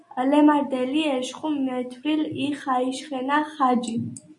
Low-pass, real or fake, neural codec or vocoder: 10.8 kHz; real; none